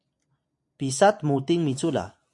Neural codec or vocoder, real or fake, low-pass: none; real; 10.8 kHz